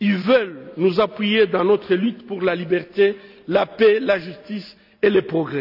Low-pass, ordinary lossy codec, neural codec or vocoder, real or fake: 5.4 kHz; none; none; real